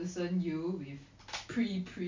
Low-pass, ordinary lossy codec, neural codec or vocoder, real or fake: 7.2 kHz; MP3, 64 kbps; none; real